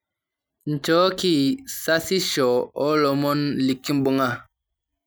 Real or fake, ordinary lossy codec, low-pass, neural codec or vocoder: real; none; none; none